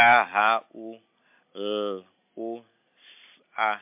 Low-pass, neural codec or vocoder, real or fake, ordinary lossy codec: 3.6 kHz; none; real; MP3, 32 kbps